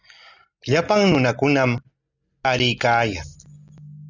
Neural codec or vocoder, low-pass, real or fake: none; 7.2 kHz; real